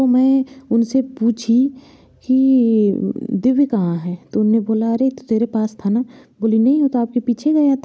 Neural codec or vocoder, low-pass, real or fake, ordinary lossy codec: none; none; real; none